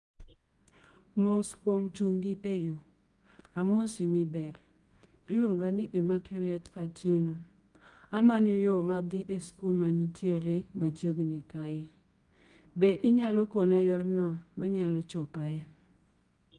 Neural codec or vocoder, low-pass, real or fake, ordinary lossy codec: codec, 24 kHz, 0.9 kbps, WavTokenizer, medium music audio release; 10.8 kHz; fake; Opus, 32 kbps